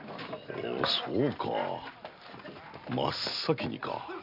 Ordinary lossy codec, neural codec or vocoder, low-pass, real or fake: none; none; 5.4 kHz; real